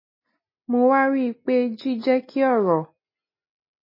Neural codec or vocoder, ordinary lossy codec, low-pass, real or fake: none; MP3, 24 kbps; 5.4 kHz; real